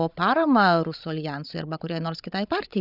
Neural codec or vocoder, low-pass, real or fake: codec, 16 kHz, 16 kbps, FreqCodec, larger model; 5.4 kHz; fake